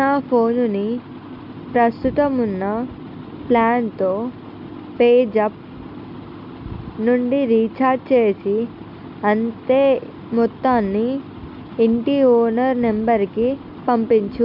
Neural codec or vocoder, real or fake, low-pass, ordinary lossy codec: none; real; 5.4 kHz; none